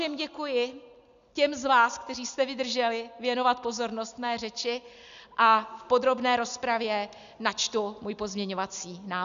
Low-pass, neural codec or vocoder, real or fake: 7.2 kHz; none; real